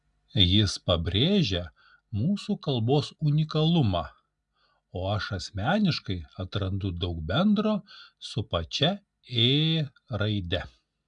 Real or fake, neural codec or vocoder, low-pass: real; none; 10.8 kHz